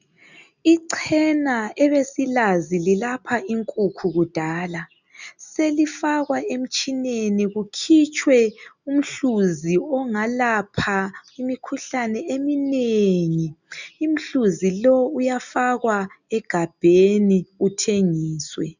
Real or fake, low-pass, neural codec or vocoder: real; 7.2 kHz; none